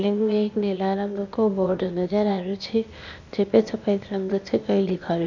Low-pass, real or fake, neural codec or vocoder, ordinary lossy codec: 7.2 kHz; fake; codec, 16 kHz, 0.8 kbps, ZipCodec; none